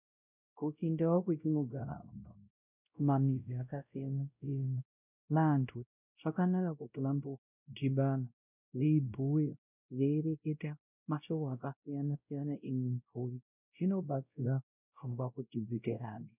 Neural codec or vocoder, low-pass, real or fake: codec, 16 kHz, 0.5 kbps, X-Codec, WavLM features, trained on Multilingual LibriSpeech; 3.6 kHz; fake